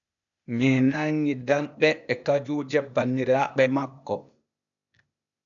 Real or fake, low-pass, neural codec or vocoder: fake; 7.2 kHz; codec, 16 kHz, 0.8 kbps, ZipCodec